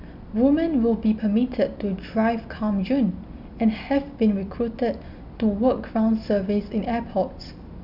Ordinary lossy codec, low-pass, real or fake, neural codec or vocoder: none; 5.4 kHz; real; none